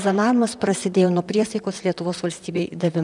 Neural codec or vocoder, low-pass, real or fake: vocoder, 44.1 kHz, 128 mel bands, Pupu-Vocoder; 10.8 kHz; fake